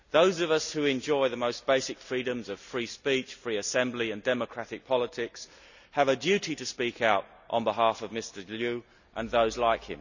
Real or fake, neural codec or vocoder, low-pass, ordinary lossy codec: real; none; 7.2 kHz; none